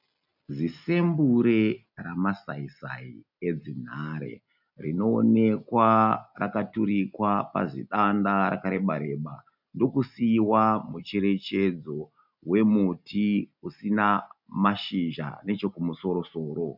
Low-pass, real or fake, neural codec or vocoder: 5.4 kHz; real; none